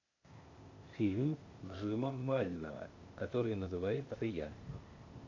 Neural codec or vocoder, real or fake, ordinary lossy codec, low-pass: codec, 16 kHz, 0.8 kbps, ZipCodec; fake; AAC, 48 kbps; 7.2 kHz